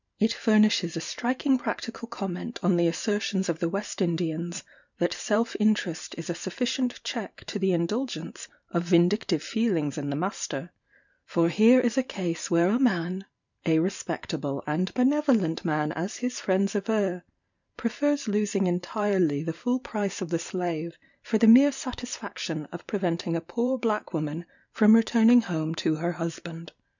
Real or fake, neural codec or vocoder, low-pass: fake; vocoder, 44.1 kHz, 128 mel bands every 512 samples, BigVGAN v2; 7.2 kHz